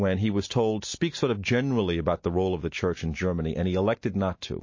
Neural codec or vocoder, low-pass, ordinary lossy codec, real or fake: none; 7.2 kHz; MP3, 32 kbps; real